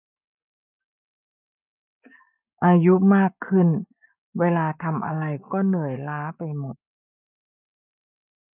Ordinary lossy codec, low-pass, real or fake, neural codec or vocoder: none; 3.6 kHz; fake; codec, 44.1 kHz, 7.8 kbps, Pupu-Codec